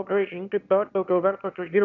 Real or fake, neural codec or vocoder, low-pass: fake; autoencoder, 22.05 kHz, a latent of 192 numbers a frame, VITS, trained on one speaker; 7.2 kHz